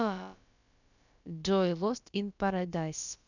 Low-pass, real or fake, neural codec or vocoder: 7.2 kHz; fake; codec, 16 kHz, about 1 kbps, DyCAST, with the encoder's durations